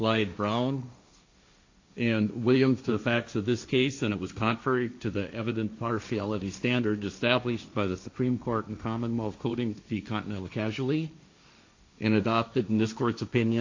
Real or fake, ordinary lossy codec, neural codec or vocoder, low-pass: fake; AAC, 48 kbps; codec, 16 kHz, 1.1 kbps, Voila-Tokenizer; 7.2 kHz